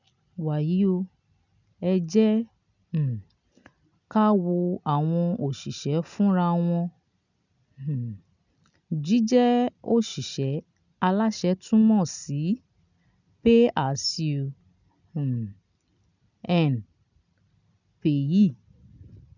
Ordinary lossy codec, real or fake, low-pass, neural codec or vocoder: none; real; 7.2 kHz; none